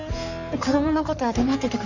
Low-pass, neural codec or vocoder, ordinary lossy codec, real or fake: 7.2 kHz; codec, 44.1 kHz, 3.4 kbps, Pupu-Codec; none; fake